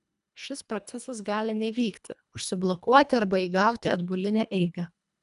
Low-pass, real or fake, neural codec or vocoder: 10.8 kHz; fake; codec, 24 kHz, 1.5 kbps, HILCodec